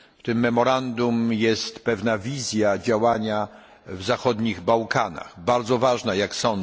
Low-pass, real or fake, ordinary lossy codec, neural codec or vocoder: none; real; none; none